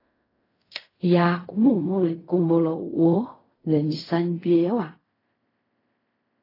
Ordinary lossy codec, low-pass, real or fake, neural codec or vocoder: AAC, 24 kbps; 5.4 kHz; fake; codec, 16 kHz in and 24 kHz out, 0.4 kbps, LongCat-Audio-Codec, fine tuned four codebook decoder